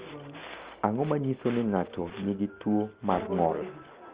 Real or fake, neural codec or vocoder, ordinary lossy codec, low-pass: real; none; Opus, 24 kbps; 3.6 kHz